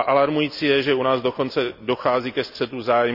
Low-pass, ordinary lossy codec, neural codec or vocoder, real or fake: 5.4 kHz; none; none; real